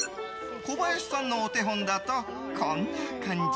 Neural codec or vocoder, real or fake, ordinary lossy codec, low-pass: none; real; none; none